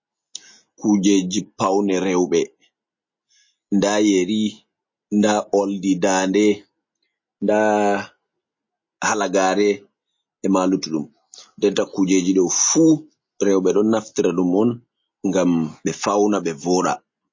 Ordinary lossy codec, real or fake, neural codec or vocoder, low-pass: MP3, 32 kbps; real; none; 7.2 kHz